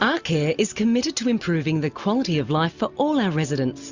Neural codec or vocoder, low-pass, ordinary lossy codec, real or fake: none; 7.2 kHz; Opus, 64 kbps; real